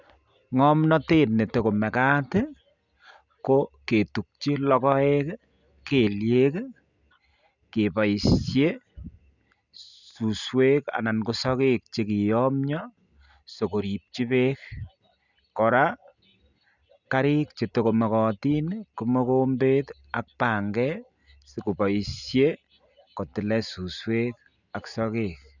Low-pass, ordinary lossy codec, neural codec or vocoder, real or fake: 7.2 kHz; none; none; real